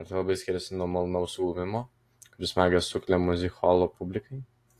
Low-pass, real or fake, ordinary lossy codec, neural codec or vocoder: 14.4 kHz; real; AAC, 48 kbps; none